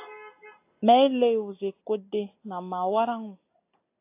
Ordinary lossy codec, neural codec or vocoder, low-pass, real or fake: AAC, 24 kbps; none; 3.6 kHz; real